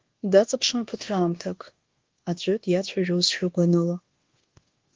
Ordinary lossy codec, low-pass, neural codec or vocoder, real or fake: Opus, 16 kbps; 7.2 kHz; codec, 24 kHz, 1.2 kbps, DualCodec; fake